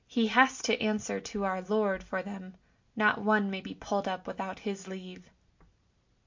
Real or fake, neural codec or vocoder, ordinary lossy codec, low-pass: real; none; MP3, 48 kbps; 7.2 kHz